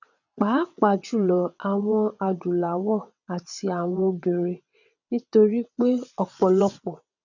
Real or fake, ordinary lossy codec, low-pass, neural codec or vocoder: fake; none; 7.2 kHz; vocoder, 22.05 kHz, 80 mel bands, WaveNeXt